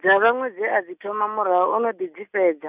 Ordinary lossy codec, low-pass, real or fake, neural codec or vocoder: none; 3.6 kHz; real; none